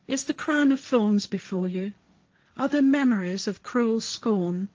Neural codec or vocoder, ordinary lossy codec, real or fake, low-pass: codec, 16 kHz, 1.1 kbps, Voila-Tokenizer; Opus, 24 kbps; fake; 7.2 kHz